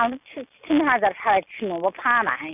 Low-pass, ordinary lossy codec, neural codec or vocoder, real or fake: 3.6 kHz; none; none; real